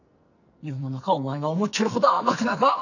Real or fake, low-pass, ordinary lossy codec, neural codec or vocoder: fake; 7.2 kHz; none; codec, 44.1 kHz, 2.6 kbps, SNAC